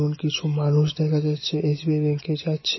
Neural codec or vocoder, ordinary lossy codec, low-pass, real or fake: none; MP3, 24 kbps; 7.2 kHz; real